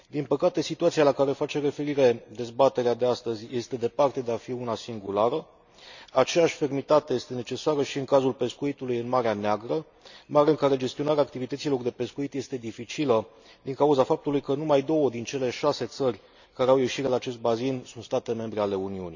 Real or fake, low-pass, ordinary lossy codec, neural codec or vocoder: real; 7.2 kHz; none; none